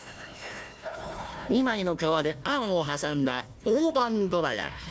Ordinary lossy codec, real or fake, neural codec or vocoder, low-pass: none; fake; codec, 16 kHz, 1 kbps, FunCodec, trained on Chinese and English, 50 frames a second; none